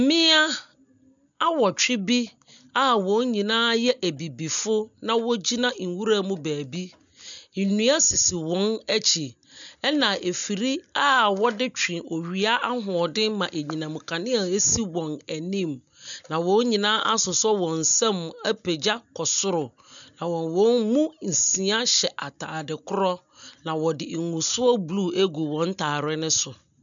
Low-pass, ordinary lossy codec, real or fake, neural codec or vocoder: 7.2 kHz; MP3, 96 kbps; real; none